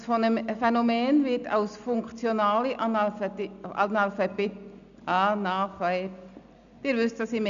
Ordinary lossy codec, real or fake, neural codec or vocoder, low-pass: none; real; none; 7.2 kHz